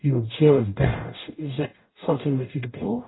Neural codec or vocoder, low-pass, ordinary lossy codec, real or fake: codec, 44.1 kHz, 0.9 kbps, DAC; 7.2 kHz; AAC, 16 kbps; fake